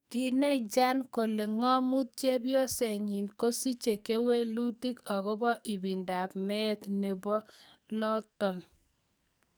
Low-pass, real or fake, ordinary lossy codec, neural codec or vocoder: none; fake; none; codec, 44.1 kHz, 2.6 kbps, SNAC